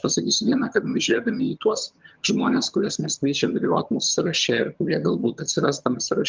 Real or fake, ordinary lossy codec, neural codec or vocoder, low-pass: fake; Opus, 32 kbps; vocoder, 22.05 kHz, 80 mel bands, HiFi-GAN; 7.2 kHz